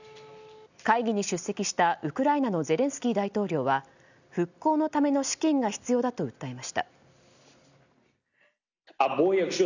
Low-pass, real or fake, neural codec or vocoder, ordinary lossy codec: 7.2 kHz; real; none; none